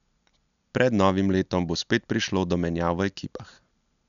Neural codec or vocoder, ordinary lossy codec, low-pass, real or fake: none; none; 7.2 kHz; real